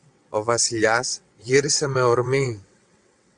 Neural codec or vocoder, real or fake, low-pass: vocoder, 22.05 kHz, 80 mel bands, WaveNeXt; fake; 9.9 kHz